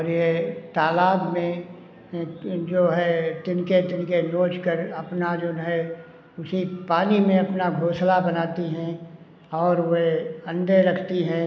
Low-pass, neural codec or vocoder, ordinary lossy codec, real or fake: none; none; none; real